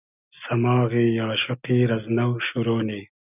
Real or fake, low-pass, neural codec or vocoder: real; 3.6 kHz; none